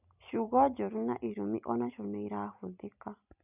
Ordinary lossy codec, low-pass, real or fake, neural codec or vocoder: none; 3.6 kHz; real; none